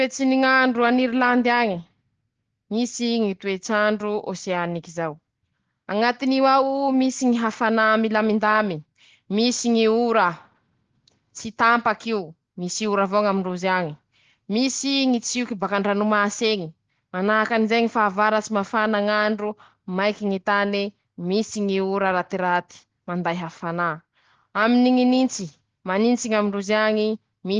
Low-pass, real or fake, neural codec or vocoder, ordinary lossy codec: 7.2 kHz; real; none; Opus, 16 kbps